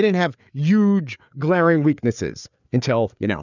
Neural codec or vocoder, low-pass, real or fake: codec, 16 kHz, 4 kbps, FreqCodec, larger model; 7.2 kHz; fake